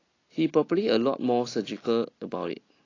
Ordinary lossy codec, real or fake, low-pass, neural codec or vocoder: AAC, 32 kbps; real; 7.2 kHz; none